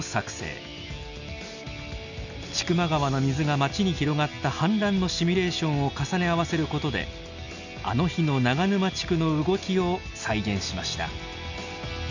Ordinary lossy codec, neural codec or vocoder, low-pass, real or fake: none; none; 7.2 kHz; real